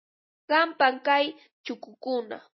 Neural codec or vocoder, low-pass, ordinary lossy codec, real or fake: none; 7.2 kHz; MP3, 24 kbps; real